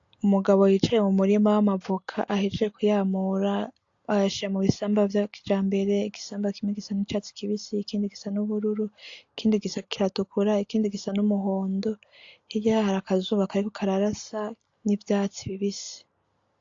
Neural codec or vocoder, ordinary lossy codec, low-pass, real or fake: none; AAC, 48 kbps; 7.2 kHz; real